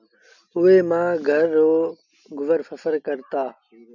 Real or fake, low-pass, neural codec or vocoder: real; 7.2 kHz; none